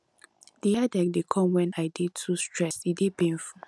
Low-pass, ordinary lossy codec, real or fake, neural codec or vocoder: none; none; real; none